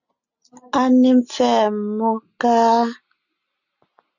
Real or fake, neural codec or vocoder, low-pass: real; none; 7.2 kHz